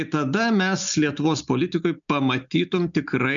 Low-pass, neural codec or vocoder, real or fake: 7.2 kHz; none; real